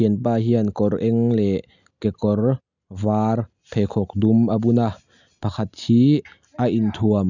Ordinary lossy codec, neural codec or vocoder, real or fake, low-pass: none; none; real; 7.2 kHz